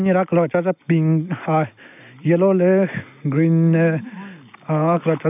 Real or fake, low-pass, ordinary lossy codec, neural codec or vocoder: real; 3.6 kHz; none; none